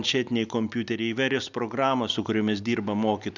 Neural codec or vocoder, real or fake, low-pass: none; real; 7.2 kHz